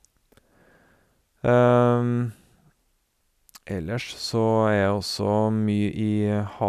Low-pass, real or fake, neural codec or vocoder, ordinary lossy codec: 14.4 kHz; real; none; none